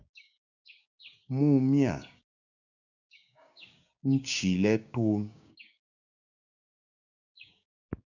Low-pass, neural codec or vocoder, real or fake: 7.2 kHz; codec, 16 kHz, 6 kbps, DAC; fake